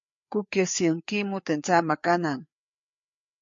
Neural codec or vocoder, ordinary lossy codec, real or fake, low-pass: codec, 16 kHz, 4 kbps, FreqCodec, larger model; MP3, 48 kbps; fake; 7.2 kHz